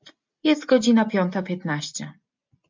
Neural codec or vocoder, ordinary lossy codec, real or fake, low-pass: none; MP3, 64 kbps; real; 7.2 kHz